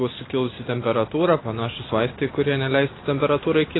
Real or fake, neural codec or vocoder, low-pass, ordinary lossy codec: real; none; 7.2 kHz; AAC, 16 kbps